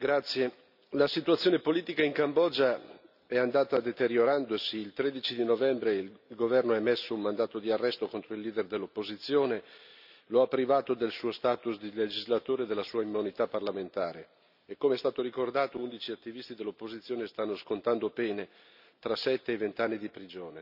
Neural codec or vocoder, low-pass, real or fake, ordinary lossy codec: none; 5.4 kHz; real; none